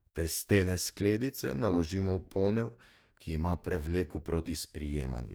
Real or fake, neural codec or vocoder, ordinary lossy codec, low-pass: fake; codec, 44.1 kHz, 2.6 kbps, DAC; none; none